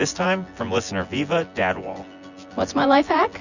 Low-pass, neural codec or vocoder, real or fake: 7.2 kHz; vocoder, 24 kHz, 100 mel bands, Vocos; fake